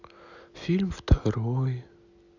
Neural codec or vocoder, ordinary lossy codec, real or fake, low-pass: none; none; real; 7.2 kHz